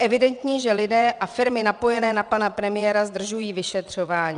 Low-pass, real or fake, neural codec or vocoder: 9.9 kHz; fake; vocoder, 22.05 kHz, 80 mel bands, WaveNeXt